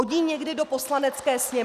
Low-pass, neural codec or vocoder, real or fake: 14.4 kHz; none; real